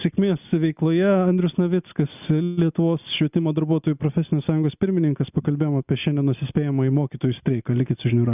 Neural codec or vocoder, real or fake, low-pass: none; real; 3.6 kHz